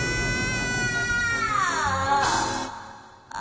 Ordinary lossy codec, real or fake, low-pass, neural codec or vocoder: none; real; none; none